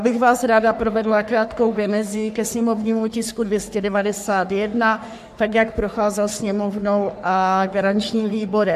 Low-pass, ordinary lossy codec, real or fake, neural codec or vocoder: 14.4 kHz; AAC, 96 kbps; fake; codec, 44.1 kHz, 3.4 kbps, Pupu-Codec